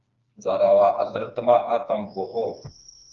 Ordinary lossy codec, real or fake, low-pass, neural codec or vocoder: Opus, 32 kbps; fake; 7.2 kHz; codec, 16 kHz, 2 kbps, FreqCodec, smaller model